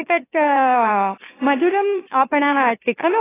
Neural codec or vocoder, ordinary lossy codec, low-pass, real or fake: autoencoder, 44.1 kHz, a latent of 192 numbers a frame, MeloTTS; AAC, 16 kbps; 3.6 kHz; fake